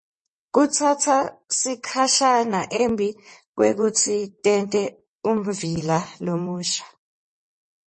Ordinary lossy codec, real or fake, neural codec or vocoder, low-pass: MP3, 32 kbps; fake; vocoder, 22.05 kHz, 80 mel bands, WaveNeXt; 9.9 kHz